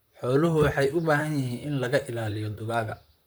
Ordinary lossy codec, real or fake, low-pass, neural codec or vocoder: none; fake; none; vocoder, 44.1 kHz, 128 mel bands, Pupu-Vocoder